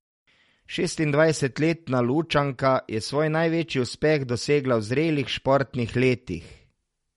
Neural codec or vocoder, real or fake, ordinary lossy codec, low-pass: none; real; MP3, 48 kbps; 14.4 kHz